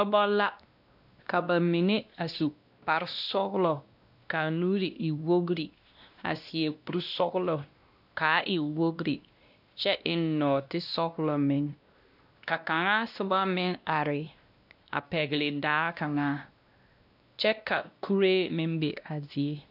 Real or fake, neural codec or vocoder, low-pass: fake; codec, 16 kHz, 1 kbps, X-Codec, WavLM features, trained on Multilingual LibriSpeech; 5.4 kHz